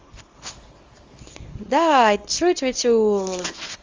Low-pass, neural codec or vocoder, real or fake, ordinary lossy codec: 7.2 kHz; codec, 24 kHz, 0.9 kbps, WavTokenizer, small release; fake; Opus, 32 kbps